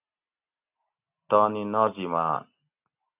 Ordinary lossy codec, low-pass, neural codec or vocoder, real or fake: AAC, 32 kbps; 3.6 kHz; none; real